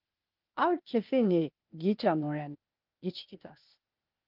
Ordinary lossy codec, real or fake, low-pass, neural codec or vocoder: Opus, 24 kbps; fake; 5.4 kHz; codec, 16 kHz, 0.8 kbps, ZipCodec